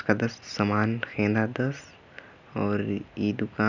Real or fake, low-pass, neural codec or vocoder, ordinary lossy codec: real; 7.2 kHz; none; none